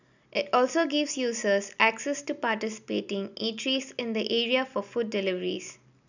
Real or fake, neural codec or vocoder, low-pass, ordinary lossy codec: real; none; 7.2 kHz; none